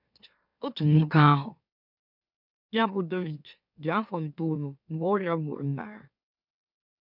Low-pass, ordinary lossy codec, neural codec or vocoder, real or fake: 5.4 kHz; none; autoencoder, 44.1 kHz, a latent of 192 numbers a frame, MeloTTS; fake